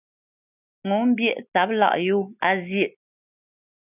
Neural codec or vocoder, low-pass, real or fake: none; 3.6 kHz; real